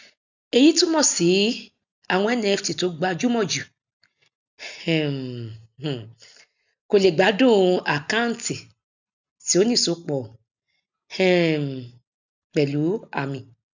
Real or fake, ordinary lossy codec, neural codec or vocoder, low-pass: real; none; none; 7.2 kHz